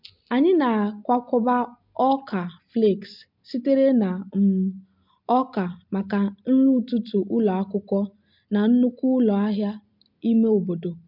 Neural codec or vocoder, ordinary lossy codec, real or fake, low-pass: none; MP3, 48 kbps; real; 5.4 kHz